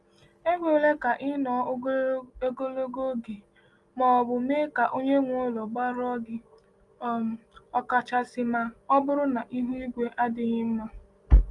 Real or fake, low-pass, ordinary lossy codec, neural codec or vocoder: real; 10.8 kHz; Opus, 32 kbps; none